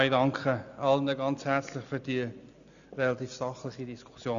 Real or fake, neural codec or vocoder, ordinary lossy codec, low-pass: real; none; none; 7.2 kHz